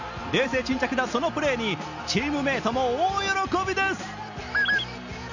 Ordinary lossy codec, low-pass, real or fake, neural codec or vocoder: none; 7.2 kHz; real; none